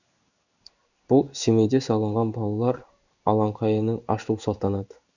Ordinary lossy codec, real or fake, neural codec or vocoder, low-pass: none; fake; codec, 16 kHz in and 24 kHz out, 1 kbps, XY-Tokenizer; 7.2 kHz